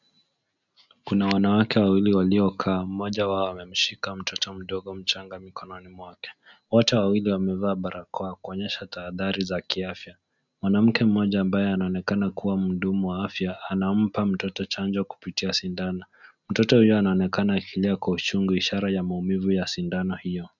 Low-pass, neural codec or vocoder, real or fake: 7.2 kHz; none; real